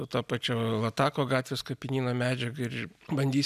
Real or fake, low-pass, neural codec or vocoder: real; 14.4 kHz; none